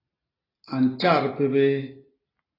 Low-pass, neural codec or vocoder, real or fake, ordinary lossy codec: 5.4 kHz; none; real; AAC, 24 kbps